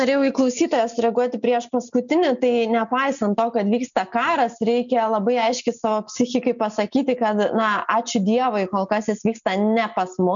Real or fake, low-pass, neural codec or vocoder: real; 7.2 kHz; none